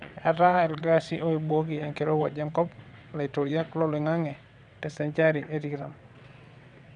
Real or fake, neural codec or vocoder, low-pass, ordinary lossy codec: fake; vocoder, 22.05 kHz, 80 mel bands, WaveNeXt; 9.9 kHz; none